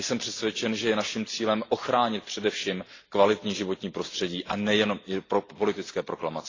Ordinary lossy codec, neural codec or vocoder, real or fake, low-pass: AAC, 32 kbps; none; real; 7.2 kHz